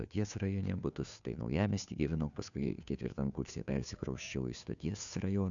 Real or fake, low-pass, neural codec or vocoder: fake; 7.2 kHz; codec, 16 kHz, 2 kbps, FunCodec, trained on Chinese and English, 25 frames a second